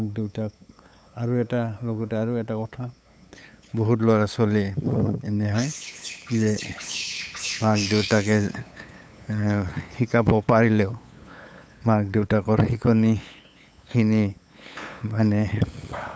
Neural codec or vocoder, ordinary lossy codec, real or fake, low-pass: codec, 16 kHz, 8 kbps, FunCodec, trained on LibriTTS, 25 frames a second; none; fake; none